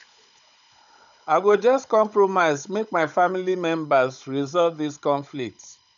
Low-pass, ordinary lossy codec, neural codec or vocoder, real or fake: 7.2 kHz; none; codec, 16 kHz, 16 kbps, FunCodec, trained on Chinese and English, 50 frames a second; fake